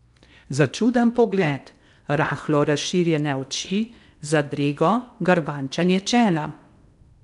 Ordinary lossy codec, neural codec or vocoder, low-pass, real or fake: none; codec, 16 kHz in and 24 kHz out, 0.8 kbps, FocalCodec, streaming, 65536 codes; 10.8 kHz; fake